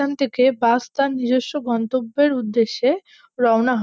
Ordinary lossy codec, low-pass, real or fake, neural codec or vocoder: none; none; real; none